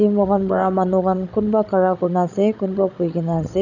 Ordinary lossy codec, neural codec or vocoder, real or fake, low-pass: none; codec, 16 kHz, 16 kbps, FreqCodec, larger model; fake; 7.2 kHz